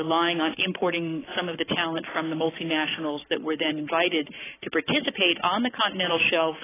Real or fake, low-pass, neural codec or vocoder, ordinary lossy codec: fake; 3.6 kHz; codec, 44.1 kHz, 7.8 kbps, Pupu-Codec; AAC, 16 kbps